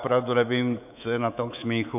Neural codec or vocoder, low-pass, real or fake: codec, 24 kHz, 3.1 kbps, DualCodec; 3.6 kHz; fake